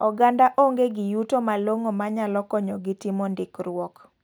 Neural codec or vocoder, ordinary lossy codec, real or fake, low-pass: none; none; real; none